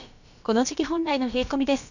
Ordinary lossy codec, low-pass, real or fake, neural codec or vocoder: none; 7.2 kHz; fake; codec, 16 kHz, about 1 kbps, DyCAST, with the encoder's durations